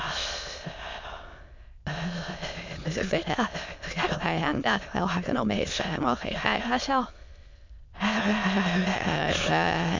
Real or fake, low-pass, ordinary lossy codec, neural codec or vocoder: fake; 7.2 kHz; MP3, 64 kbps; autoencoder, 22.05 kHz, a latent of 192 numbers a frame, VITS, trained on many speakers